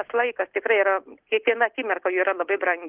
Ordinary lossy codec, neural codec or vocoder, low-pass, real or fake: Opus, 16 kbps; none; 3.6 kHz; real